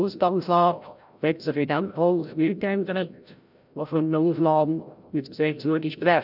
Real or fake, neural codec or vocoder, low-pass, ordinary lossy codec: fake; codec, 16 kHz, 0.5 kbps, FreqCodec, larger model; 5.4 kHz; none